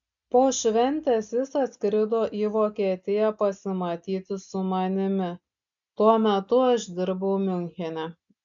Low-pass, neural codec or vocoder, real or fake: 7.2 kHz; none; real